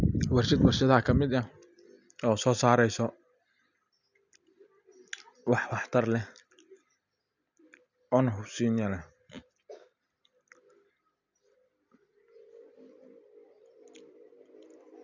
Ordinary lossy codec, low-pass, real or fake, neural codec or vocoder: none; 7.2 kHz; real; none